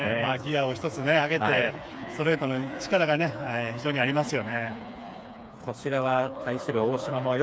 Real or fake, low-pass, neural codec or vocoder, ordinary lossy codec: fake; none; codec, 16 kHz, 4 kbps, FreqCodec, smaller model; none